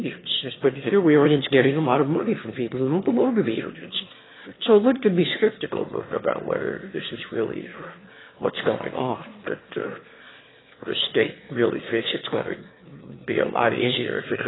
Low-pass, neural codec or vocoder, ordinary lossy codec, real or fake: 7.2 kHz; autoencoder, 22.05 kHz, a latent of 192 numbers a frame, VITS, trained on one speaker; AAC, 16 kbps; fake